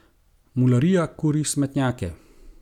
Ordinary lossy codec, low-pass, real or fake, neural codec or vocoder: none; 19.8 kHz; real; none